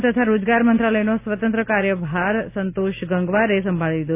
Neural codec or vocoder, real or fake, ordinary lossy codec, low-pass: none; real; none; 3.6 kHz